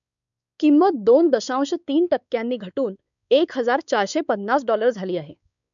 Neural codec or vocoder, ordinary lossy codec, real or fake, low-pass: codec, 16 kHz, 4 kbps, X-Codec, WavLM features, trained on Multilingual LibriSpeech; none; fake; 7.2 kHz